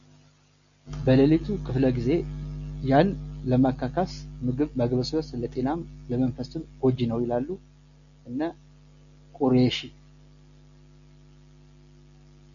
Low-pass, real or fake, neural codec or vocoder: 7.2 kHz; real; none